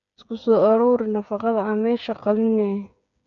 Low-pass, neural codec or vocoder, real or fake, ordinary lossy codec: 7.2 kHz; codec, 16 kHz, 8 kbps, FreqCodec, smaller model; fake; none